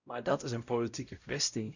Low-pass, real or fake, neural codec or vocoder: 7.2 kHz; fake; codec, 16 kHz, 0.5 kbps, X-Codec, WavLM features, trained on Multilingual LibriSpeech